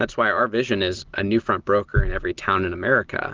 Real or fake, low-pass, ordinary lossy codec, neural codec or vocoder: real; 7.2 kHz; Opus, 16 kbps; none